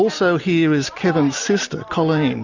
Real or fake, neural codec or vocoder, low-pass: real; none; 7.2 kHz